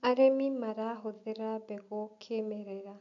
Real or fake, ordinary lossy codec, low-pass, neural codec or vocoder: real; none; 7.2 kHz; none